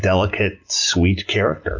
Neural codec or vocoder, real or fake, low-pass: none; real; 7.2 kHz